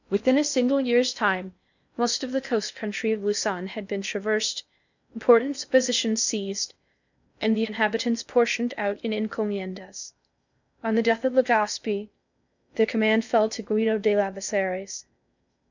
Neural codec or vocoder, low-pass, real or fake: codec, 16 kHz in and 24 kHz out, 0.6 kbps, FocalCodec, streaming, 2048 codes; 7.2 kHz; fake